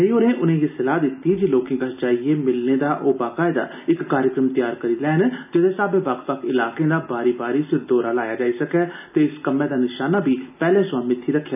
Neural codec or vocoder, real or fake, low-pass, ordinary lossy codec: none; real; 3.6 kHz; none